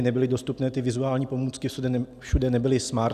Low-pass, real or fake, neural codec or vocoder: 14.4 kHz; real; none